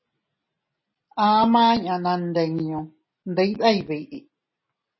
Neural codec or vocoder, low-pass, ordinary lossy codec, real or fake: none; 7.2 kHz; MP3, 24 kbps; real